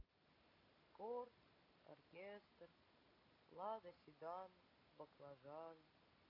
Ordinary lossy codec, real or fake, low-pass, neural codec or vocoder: none; real; 5.4 kHz; none